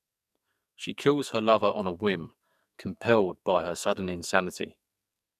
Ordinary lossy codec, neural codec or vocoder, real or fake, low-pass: none; codec, 44.1 kHz, 2.6 kbps, SNAC; fake; 14.4 kHz